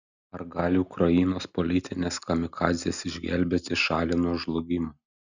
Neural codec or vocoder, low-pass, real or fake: none; 7.2 kHz; real